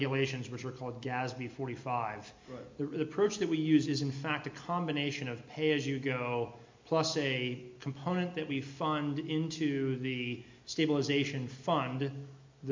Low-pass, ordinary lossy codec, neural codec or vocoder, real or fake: 7.2 kHz; MP3, 48 kbps; none; real